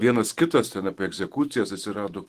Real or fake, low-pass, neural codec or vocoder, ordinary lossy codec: fake; 14.4 kHz; autoencoder, 48 kHz, 128 numbers a frame, DAC-VAE, trained on Japanese speech; Opus, 16 kbps